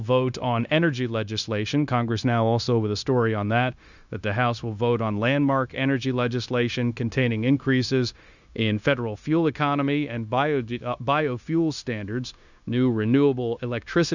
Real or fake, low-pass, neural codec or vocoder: fake; 7.2 kHz; codec, 16 kHz, 0.9 kbps, LongCat-Audio-Codec